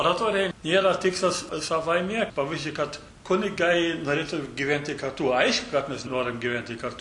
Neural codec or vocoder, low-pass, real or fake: none; 10.8 kHz; real